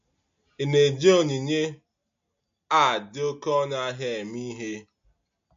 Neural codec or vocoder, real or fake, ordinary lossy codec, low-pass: none; real; MP3, 48 kbps; 7.2 kHz